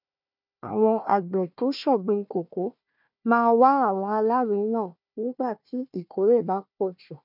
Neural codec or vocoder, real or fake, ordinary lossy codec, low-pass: codec, 16 kHz, 1 kbps, FunCodec, trained on Chinese and English, 50 frames a second; fake; none; 5.4 kHz